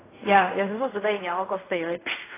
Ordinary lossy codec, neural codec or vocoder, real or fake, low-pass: AAC, 16 kbps; codec, 16 kHz in and 24 kHz out, 0.4 kbps, LongCat-Audio-Codec, fine tuned four codebook decoder; fake; 3.6 kHz